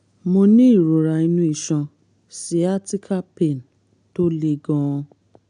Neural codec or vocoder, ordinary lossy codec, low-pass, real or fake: none; none; 9.9 kHz; real